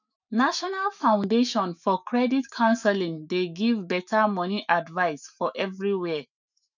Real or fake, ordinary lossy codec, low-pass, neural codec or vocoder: fake; none; 7.2 kHz; autoencoder, 48 kHz, 128 numbers a frame, DAC-VAE, trained on Japanese speech